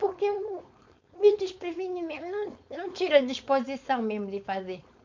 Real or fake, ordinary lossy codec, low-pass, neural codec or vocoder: fake; MP3, 48 kbps; 7.2 kHz; codec, 16 kHz, 4.8 kbps, FACodec